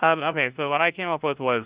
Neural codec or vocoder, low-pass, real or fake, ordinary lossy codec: codec, 16 kHz, 1 kbps, FunCodec, trained on LibriTTS, 50 frames a second; 3.6 kHz; fake; Opus, 64 kbps